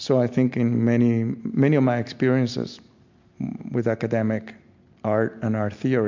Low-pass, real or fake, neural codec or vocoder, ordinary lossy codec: 7.2 kHz; real; none; MP3, 64 kbps